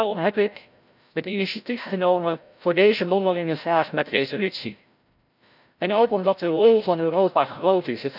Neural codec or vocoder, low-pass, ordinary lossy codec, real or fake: codec, 16 kHz, 0.5 kbps, FreqCodec, larger model; 5.4 kHz; none; fake